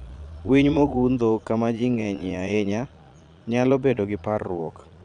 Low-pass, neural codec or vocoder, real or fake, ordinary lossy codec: 9.9 kHz; vocoder, 22.05 kHz, 80 mel bands, Vocos; fake; none